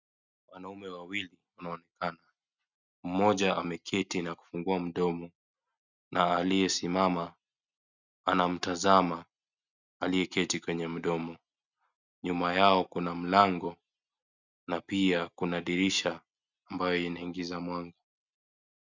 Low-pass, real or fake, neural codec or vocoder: 7.2 kHz; real; none